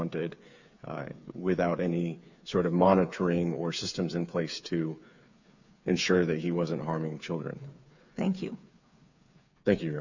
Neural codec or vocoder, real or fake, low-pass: codec, 16 kHz, 8 kbps, FreqCodec, smaller model; fake; 7.2 kHz